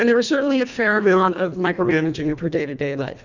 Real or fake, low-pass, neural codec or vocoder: fake; 7.2 kHz; codec, 24 kHz, 1.5 kbps, HILCodec